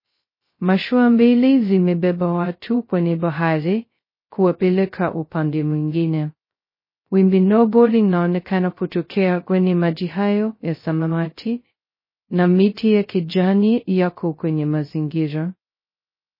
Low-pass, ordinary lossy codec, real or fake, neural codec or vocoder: 5.4 kHz; MP3, 24 kbps; fake; codec, 16 kHz, 0.2 kbps, FocalCodec